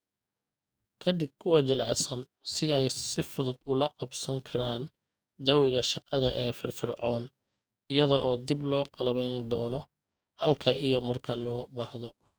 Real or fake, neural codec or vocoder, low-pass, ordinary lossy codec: fake; codec, 44.1 kHz, 2.6 kbps, DAC; none; none